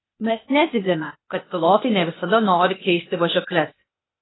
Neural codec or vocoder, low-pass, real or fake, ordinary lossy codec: codec, 16 kHz, 0.8 kbps, ZipCodec; 7.2 kHz; fake; AAC, 16 kbps